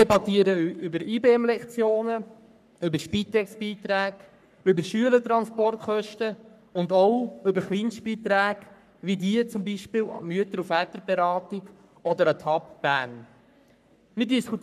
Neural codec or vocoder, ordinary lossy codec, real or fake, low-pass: codec, 44.1 kHz, 3.4 kbps, Pupu-Codec; none; fake; 14.4 kHz